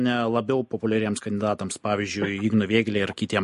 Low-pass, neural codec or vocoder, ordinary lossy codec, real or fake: 14.4 kHz; none; MP3, 48 kbps; real